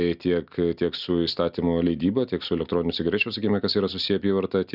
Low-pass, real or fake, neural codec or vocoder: 5.4 kHz; real; none